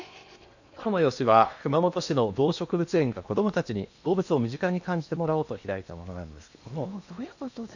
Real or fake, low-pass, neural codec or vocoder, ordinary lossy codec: fake; 7.2 kHz; codec, 16 kHz in and 24 kHz out, 0.8 kbps, FocalCodec, streaming, 65536 codes; none